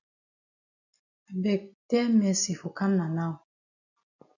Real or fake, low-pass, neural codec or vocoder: real; 7.2 kHz; none